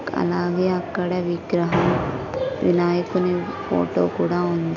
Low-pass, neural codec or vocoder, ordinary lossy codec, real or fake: 7.2 kHz; none; none; real